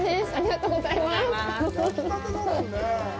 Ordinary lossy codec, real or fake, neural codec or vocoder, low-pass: none; real; none; none